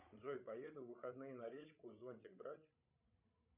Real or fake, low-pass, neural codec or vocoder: fake; 3.6 kHz; codec, 16 kHz, 16 kbps, FreqCodec, larger model